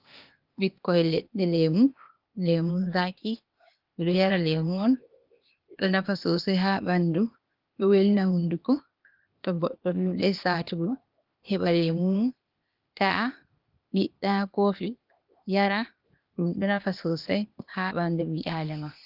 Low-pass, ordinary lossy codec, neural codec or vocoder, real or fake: 5.4 kHz; Opus, 24 kbps; codec, 16 kHz, 0.8 kbps, ZipCodec; fake